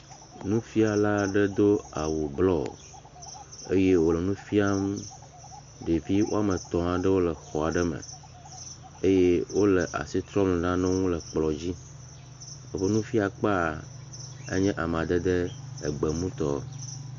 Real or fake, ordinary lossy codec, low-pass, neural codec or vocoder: real; MP3, 48 kbps; 7.2 kHz; none